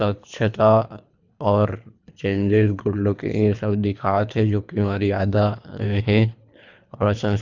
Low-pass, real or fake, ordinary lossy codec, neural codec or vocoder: 7.2 kHz; fake; none; codec, 24 kHz, 3 kbps, HILCodec